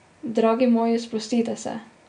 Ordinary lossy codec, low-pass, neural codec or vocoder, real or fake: none; 9.9 kHz; none; real